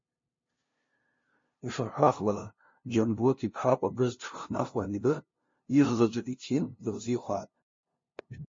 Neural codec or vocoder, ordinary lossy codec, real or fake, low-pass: codec, 16 kHz, 0.5 kbps, FunCodec, trained on LibriTTS, 25 frames a second; MP3, 32 kbps; fake; 7.2 kHz